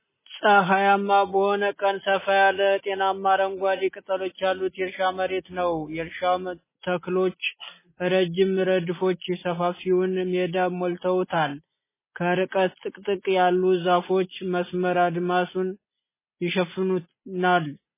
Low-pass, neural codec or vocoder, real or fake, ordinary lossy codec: 3.6 kHz; none; real; MP3, 16 kbps